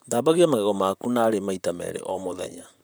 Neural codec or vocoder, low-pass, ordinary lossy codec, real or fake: vocoder, 44.1 kHz, 128 mel bands, Pupu-Vocoder; none; none; fake